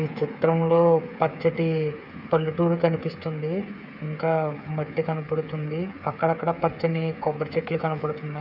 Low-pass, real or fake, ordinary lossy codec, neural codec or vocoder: 5.4 kHz; fake; none; codec, 16 kHz, 8 kbps, FreqCodec, smaller model